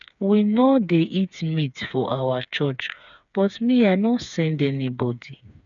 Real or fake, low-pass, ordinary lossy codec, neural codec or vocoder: fake; 7.2 kHz; none; codec, 16 kHz, 4 kbps, FreqCodec, smaller model